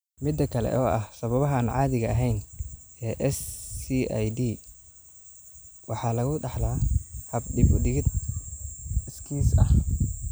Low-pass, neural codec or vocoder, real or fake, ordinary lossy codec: none; none; real; none